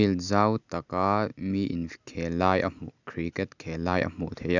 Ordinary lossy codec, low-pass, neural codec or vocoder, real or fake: none; 7.2 kHz; none; real